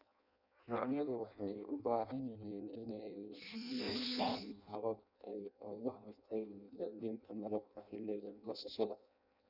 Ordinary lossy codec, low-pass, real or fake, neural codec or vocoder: none; 5.4 kHz; fake; codec, 16 kHz in and 24 kHz out, 0.6 kbps, FireRedTTS-2 codec